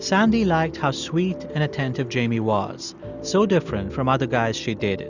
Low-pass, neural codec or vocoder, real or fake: 7.2 kHz; none; real